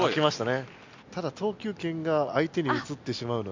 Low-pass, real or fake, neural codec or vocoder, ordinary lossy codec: 7.2 kHz; real; none; MP3, 64 kbps